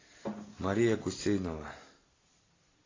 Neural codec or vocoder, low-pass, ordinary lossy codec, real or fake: none; 7.2 kHz; AAC, 32 kbps; real